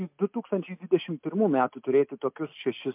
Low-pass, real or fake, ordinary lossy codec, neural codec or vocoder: 3.6 kHz; real; MP3, 32 kbps; none